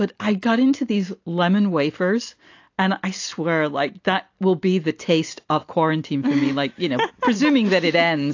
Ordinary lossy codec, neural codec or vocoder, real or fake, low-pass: AAC, 48 kbps; none; real; 7.2 kHz